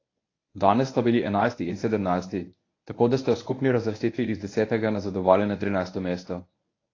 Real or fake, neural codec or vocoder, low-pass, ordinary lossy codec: fake; codec, 24 kHz, 0.9 kbps, WavTokenizer, medium speech release version 1; 7.2 kHz; AAC, 32 kbps